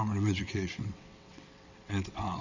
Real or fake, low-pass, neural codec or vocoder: fake; 7.2 kHz; vocoder, 22.05 kHz, 80 mel bands, WaveNeXt